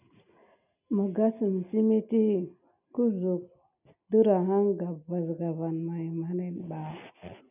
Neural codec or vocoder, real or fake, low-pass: none; real; 3.6 kHz